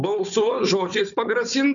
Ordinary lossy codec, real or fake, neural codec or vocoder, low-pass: MP3, 96 kbps; fake; codec, 16 kHz, 4 kbps, FunCodec, trained on Chinese and English, 50 frames a second; 7.2 kHz